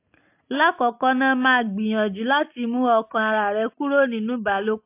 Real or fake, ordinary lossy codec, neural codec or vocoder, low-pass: real; AAC, 32 kbps; none; 3.6 kHz